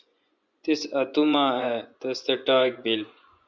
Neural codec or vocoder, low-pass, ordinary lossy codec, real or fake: vocoder, 24 kHz, 100 mel bands, Vocos; 7.2 kHz; Opus, 64 kbps; fake